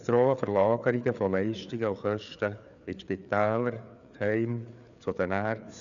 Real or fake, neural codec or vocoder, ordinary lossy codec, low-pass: fake; codec, 16 kHz, 4 kbps, FreqCodec, larger model; none; 7.2 kHz